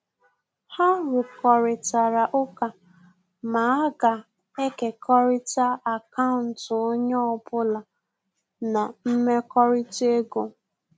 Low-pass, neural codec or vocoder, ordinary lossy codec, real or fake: none; none; none; real